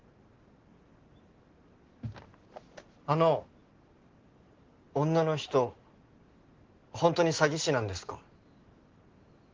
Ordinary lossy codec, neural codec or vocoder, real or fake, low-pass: Opus, 16 kbps; none; real; 7.2 kHz